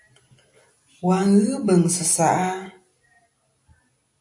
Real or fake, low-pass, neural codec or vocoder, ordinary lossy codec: real; 10.8 kHz; none; AAC, 64 kbps